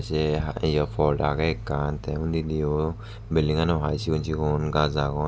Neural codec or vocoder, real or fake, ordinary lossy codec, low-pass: none; real; none; none